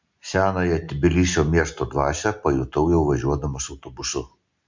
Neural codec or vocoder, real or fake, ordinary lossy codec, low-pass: none; real; MP3, 64 kbps; 7.2 kHz